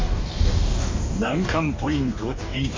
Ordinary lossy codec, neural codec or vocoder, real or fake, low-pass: MP3, 48 kbps; codec, 44.1 kHz, 2.6 kbps, DAC; fake; 7.2 kHz